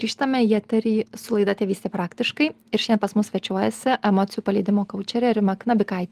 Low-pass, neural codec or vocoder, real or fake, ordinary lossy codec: 14.4 kHz; none; real; Opus, 24 kbps